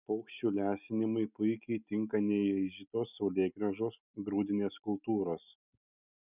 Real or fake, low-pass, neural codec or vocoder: real; 3.6 kHz; none